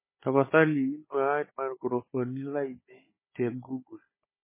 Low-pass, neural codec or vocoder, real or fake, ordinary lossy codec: 3.6 kHz; codec, 16 kHz, 16 kbps, FunCodec, trained on Chinese and English, 50 frames a second; fake; MP3, 16 kbps